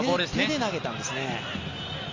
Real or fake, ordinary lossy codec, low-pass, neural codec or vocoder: real; Opus, 32 kbps; 7.2 kHz; none